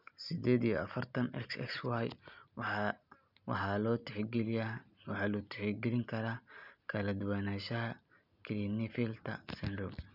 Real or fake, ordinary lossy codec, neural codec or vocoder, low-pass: real; none; none; 5.4 kHz